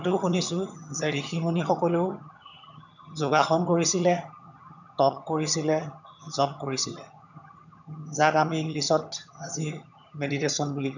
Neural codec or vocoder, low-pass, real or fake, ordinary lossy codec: vocoder, 22.05 kHz, 80 mel bands, HiFi-GAN; 7.2 kHz; fake; none